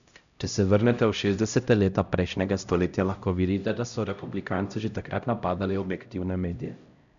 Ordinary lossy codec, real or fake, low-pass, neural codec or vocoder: none; fake; 7.2 kHz; codec, 16 kHz, 0.5 kbps, X-Codec, HuBERT features, trained on LibriSpeech